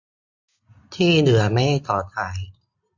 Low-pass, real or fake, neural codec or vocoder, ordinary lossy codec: 7.2 kHz; real; none; AAC, 48 kbps